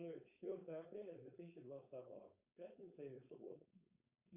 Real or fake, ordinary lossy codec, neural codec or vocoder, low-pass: fake; Opus, 64 kbps; codec, 16 kHz, 4.8 kbps, FACodec; 3.6 kHz